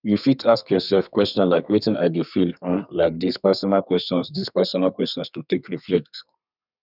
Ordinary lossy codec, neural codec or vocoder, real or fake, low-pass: none; codec, 32 kHz, 1.9 kbps, SNAC; fake; 5.4 kHz